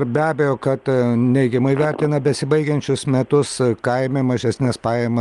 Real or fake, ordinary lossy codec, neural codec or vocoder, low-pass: real; Opus, 32 kbps; none; 10.8 kHz